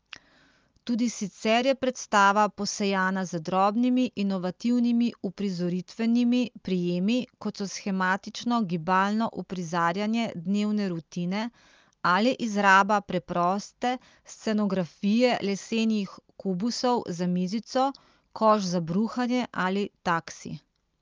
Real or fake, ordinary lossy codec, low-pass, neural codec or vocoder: real; Opus, 24 kbps; 7.2 kHz; none